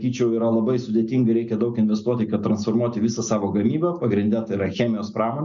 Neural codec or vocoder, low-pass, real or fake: none; 7.2 kHz; real